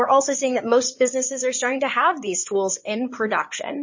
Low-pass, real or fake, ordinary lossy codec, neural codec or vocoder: 7.2 kHz; fake; MP3, 32 kbps; codec, 16 kHz in and 24 kHz out, 2.2 kbps, FireRedTTS-2 codec